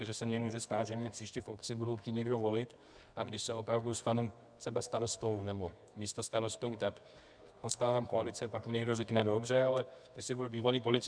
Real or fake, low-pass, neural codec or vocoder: fake; 9.9 kHz; codec, 24 kHz, 0.9 kbps, WavTokenizer, medium music audio release